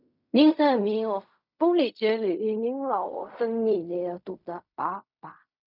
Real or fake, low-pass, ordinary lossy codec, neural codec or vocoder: fake; 5.4 kHz; none; codec, 16 kHz in and 24 kHz out, 0.4 kbps, LongCat-Audio-Codec, fine tuned four codebook decoder